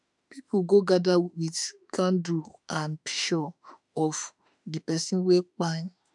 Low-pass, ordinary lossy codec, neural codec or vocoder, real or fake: 10.8 kHz; none; autoencoder, 48 kHz, 32 numbers a frame, DAC-VAE, trained on Japanese speech; fake